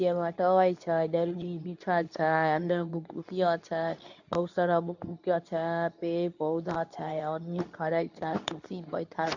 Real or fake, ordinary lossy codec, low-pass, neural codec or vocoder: fake; none; 7.2 kHz; codec, 24 kHz, 0.9 kbps, WavTokenizer, medium speech release version 2